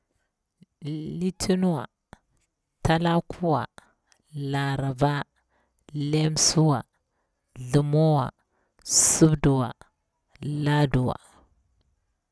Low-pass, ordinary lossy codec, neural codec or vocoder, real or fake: none; none; none; real